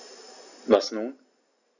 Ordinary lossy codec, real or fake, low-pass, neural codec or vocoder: none; real; 7.2 kHz; none